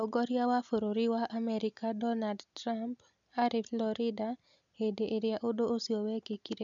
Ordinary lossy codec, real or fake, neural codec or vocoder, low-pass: none; real; none; 7.2 kHz